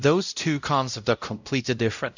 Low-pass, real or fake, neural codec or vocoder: 7.2 kHz; fake; codec, 16 kHz, 0.5 kbps, X-Codec, WavLM features, trained on Multilingual LibriSpeech